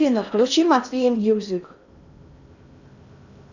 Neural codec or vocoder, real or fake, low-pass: codec, 16 kHz in and 24 kHz out, 0.6 kbps, FocalCodec, streaming, 4096 codes; fake; 7.2 kHz